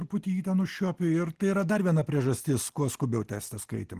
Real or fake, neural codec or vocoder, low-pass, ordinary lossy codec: real; none; 14.4 kHz; Opus, 16 kbps